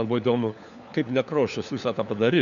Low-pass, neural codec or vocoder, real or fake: 7.2 kHz; codec, 16 kHz, 4 kbps, FunCodec, trained on LibriTTS, 50 frames a second; fake